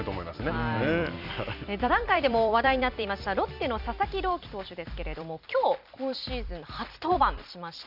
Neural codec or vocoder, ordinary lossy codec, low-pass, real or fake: vocoder, 44.1 kHz, 128 mel bands every 256 samples, BigVGAN v2; none; 5.4 kHz; fake